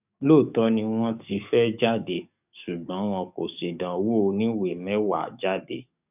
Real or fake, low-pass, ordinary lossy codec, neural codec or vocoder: fake; 3.6 kHz; none; codec, 44.1 kHz, 7.8 kbps, DAC